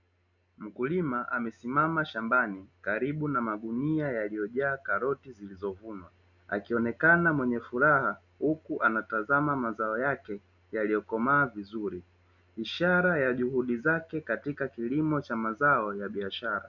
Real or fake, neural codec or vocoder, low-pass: real; none; 7.2 kHz